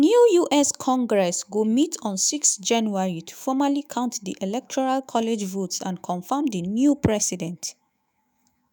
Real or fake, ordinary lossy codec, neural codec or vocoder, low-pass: fake; none; autoencoder, 48 kHz, 128 numbers a frame, DAC-VAE, trained on Japanese speech; none